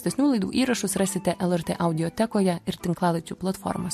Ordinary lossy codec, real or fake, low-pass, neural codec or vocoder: MP3, 64 kbps; real; 14.4 kHz; none